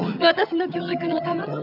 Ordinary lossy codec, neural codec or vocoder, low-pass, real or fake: none; vocoder, 22.05 kHz, 80 mel bands, HiFi-GAN; 5.4 kHz; fake